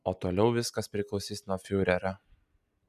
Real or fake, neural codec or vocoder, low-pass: real; none; 14.4 kHz